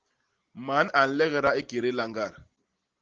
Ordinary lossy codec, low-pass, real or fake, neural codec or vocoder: Opus, 16 kbps; 7.2 kHz; real; none